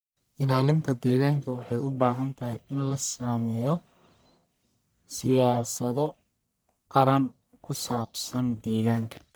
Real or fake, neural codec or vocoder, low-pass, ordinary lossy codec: fake; codec, 44.1 kHz, 1.7 kbps, Pupu-Codec; none; none